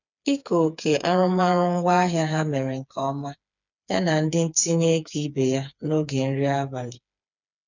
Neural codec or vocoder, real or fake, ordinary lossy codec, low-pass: codec, 16 kHz, 4 kbps, FreqCodec, smaller model; fake; none; 7.2 kHz